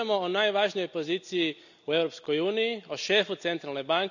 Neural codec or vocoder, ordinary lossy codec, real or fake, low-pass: none; none; real; 7.2 kHz